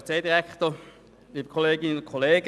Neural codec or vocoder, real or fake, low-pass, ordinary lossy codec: none; real; none; none